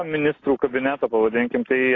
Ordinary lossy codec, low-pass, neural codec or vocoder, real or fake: AAC, 32 kbps; 7.2 kHz; none; real